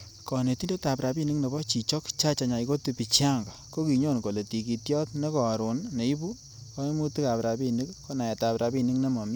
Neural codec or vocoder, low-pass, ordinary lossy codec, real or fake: none; none; none; real